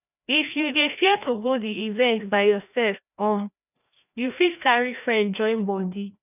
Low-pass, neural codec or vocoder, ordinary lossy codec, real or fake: 3.6 kHz; codec, 16 kHz, 1 kbps, FreqCodec, larger model; none; fake